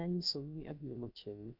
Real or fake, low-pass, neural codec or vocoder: fake; 5.4 kHz; codec, 16 kHz, about 1 kbps, DyCAST, with the encoder's durations